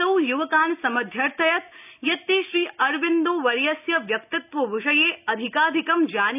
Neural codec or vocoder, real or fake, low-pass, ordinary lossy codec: none; real; 3.6 kHz; none